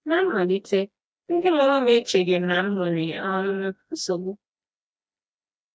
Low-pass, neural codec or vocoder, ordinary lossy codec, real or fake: none; codec, 16 kHz, 1 kbps, FreqCodec, smaller model; none; fake